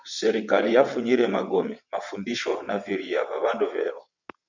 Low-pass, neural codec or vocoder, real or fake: 7.2 kHz; vocoder, 22.05 kHz, 80 mel bands, WaveNeXt; fake